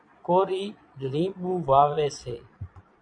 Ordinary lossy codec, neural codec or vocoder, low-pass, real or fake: MP3, 96 kbps; vocoder, 22.05 kHz, 80 mel bands, Vocos; 9.9 kHz; fake